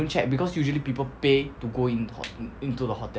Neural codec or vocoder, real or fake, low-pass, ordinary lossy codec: none; real; none; none